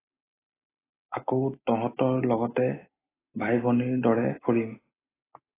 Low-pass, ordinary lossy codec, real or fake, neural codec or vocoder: 3.6 kHz; AAC, 16 kbps; real; none